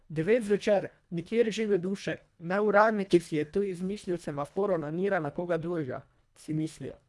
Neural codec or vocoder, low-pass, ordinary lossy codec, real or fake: codec, 24 kHz, 1.5 kbps, HILCodec; none; none; fake